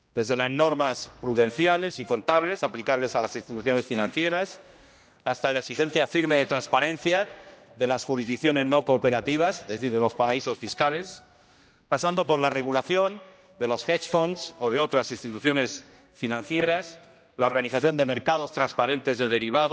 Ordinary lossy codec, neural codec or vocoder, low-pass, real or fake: none; codec, 16 kHz, 1 kbps, X-Codec, HuBERT features, trained on general audio; none; fake